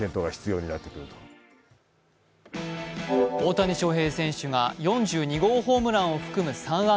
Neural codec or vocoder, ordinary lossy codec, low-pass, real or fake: none; none; none; real